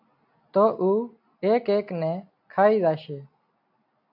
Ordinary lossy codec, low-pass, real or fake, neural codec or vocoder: AAC, 48 kbps; 5.4 kHz; real; none